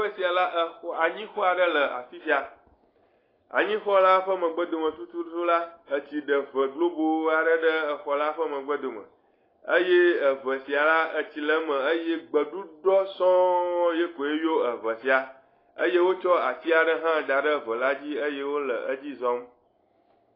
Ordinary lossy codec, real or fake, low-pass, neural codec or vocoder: AAC, 24 kbps; real; 5.4 kHz; none